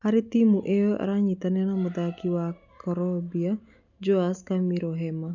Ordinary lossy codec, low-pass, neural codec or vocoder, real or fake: none; 7.2 kHz; none; real